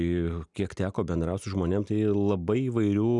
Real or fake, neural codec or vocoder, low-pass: real; none; 10.8 kHz